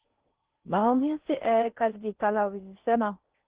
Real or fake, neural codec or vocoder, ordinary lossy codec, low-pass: fake; codec, 16 kHz in and 24 kHz out, 0.6 kbps, FocalCodec, streaming, 4096 codes; Opus, 16 kbps; 3.6 kHz